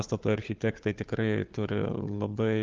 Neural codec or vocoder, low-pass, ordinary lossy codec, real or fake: codec, 16 kHz, 4 kbps, FunCodec, trained on Chinese and English, 50 frames a second; 7.2 kHz; Opus, 32 kbps; fake